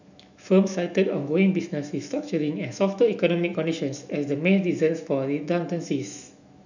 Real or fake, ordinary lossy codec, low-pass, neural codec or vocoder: fake; none; 7.2 kHz; autoencoder, 48 kHz, 128 numbers a frame, DAC-VAE, trained on Japanese speech